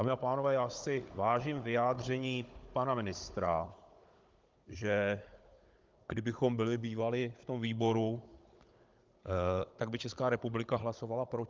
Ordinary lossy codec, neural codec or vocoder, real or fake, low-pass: Opus, 32 kbps; codec, 16 kHz, 16 kbps, FunCodec, trained on Chinese and English, 50 frames a second; fake; 7.2 kHz